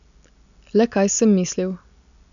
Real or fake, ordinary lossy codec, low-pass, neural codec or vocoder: real; none; 7.2 kHz; none